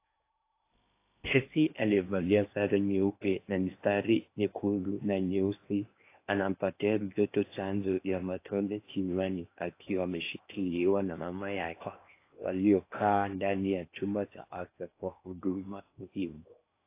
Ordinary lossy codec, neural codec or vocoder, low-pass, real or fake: AAC, 24 kbps; codec, 16 kHz in and 24 kHz out, 0.8 kbps, FocalCodec, streaming, 65536 codes; 3.6 kHz; fake